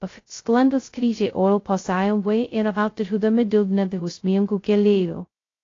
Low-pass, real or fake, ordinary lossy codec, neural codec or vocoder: 7.2 kHz; fake; AAC, 32 kbps; codec, 16 kHz, 0.2 kbps, FocalCodec